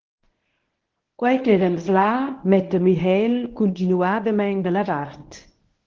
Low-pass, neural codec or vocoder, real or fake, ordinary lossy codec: 7.2 kHz; codec, 24 kHz, 0.9 kbps, WavTokenizer, medium speech release version 1; fake; Opus, 16 kbps